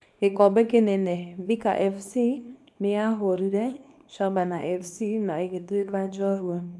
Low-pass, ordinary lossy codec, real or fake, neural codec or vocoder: none; none; fake; codec, 24 kHz, 0.9 kbps, WavTokenizer, small release